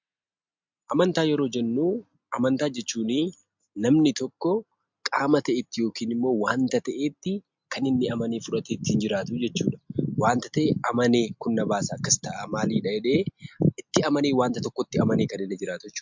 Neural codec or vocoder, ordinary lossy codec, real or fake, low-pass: none; MP3, 64 kbps; real; 7.2 kHz